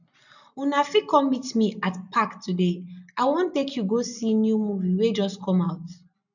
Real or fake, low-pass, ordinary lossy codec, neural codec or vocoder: real; 7.2 kHz; none; none